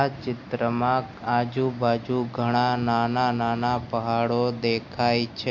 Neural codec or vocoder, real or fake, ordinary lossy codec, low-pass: none; real; MP3, 48 kbps; 7.2 kHz